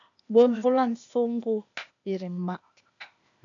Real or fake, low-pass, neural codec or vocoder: fake; 7.2 kHz; codec, 16 kHz, 0.8 kbps, ZipCodec